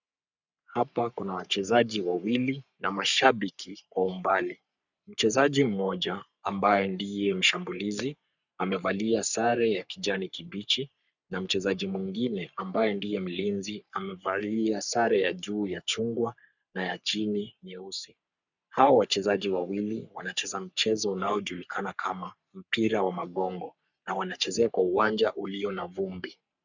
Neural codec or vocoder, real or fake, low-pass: codec, 44.1 kHz, 3.4 kbps, Pupu-Codec; fake; 7.2 kHz